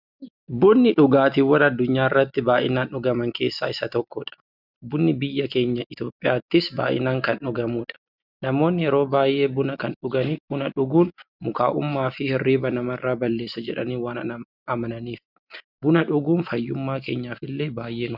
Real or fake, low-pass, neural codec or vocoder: real; 5.4 kHz; none